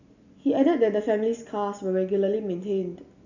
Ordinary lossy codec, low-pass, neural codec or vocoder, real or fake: none; 7.2 kHz; none; real